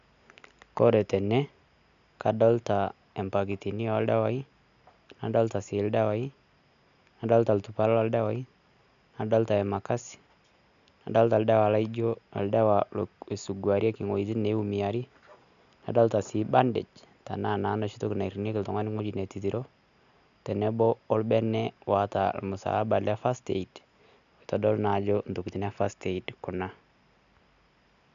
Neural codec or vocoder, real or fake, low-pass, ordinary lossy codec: none; real; 7.2 kHz; none